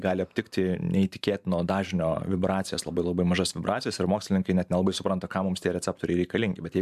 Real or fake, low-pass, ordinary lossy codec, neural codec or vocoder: fake; 14.4 kHz; MP3, 96 kbps; vocoder, 44.1 kHz, 128 mel bands every 512 samples, BigVGAN v2